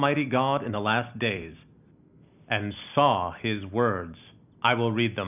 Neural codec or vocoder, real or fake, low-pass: none; real; 3.6 kHz